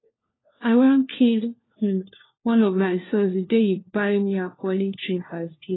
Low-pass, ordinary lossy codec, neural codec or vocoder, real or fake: 7.2 kHz; AAC, 16 kbps; codec, 16 kHz, 1 kbps, FunCodec, trained on LibriTTS, 50 frames a second; fake